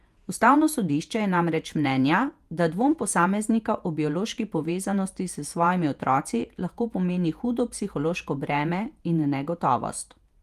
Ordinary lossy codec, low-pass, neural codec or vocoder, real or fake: Opus, 32 kbps; 14.4 kHz; vocoder, 48 kHz, 128 mel bands, Vocos; fake